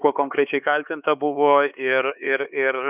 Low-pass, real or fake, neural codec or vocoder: 3.6 kHz; fake; codec, 16 kHz, 4 kbps, X-Codec, HuBERT features, trained on LibriSpeech